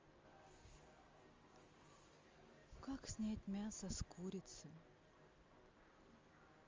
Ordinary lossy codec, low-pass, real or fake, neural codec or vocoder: Opus, 32 kbps; 7.2 kHz; real; none